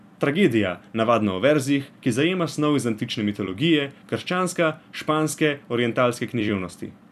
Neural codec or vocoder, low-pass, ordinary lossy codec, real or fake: vocoder, 44.1 kHz, 128 mel bands every 256 samples, BigVGAN v2; 14.4 kHz; none; fake